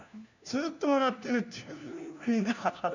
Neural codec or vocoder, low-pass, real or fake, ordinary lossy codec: codec, 16 kHz, 1 kbps, FunCodec, trained on LibriTTS, 50 frames a second; 7.2 kHz; fake; none